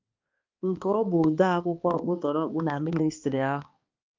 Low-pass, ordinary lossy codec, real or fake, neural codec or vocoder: 7.2 kHz; Opus, 24 kbps; fake; codec, 16 kHz, 2 kbps, X-Codec, HuBERT features, trained on balanced general audio